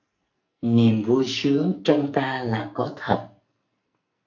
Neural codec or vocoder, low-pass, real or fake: codec, 44.1 kHz, 2.6 kbps, SNAC; 7.2 kHz; fake